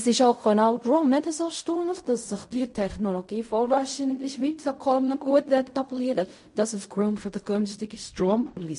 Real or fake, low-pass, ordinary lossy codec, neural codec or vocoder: fake; 10.8 kHz; MP3, 48 kbps; codec, 16 kHz in and 24 kHz out, 0.4 kbps, LongCat-Audio-Codec, fine tuned four codebook decoder